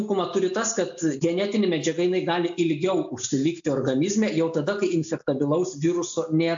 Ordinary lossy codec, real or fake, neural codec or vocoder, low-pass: AAC, 64 kbps; real; none; 7.2 kHz